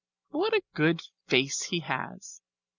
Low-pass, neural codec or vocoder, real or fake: 7.2 kHz; none; real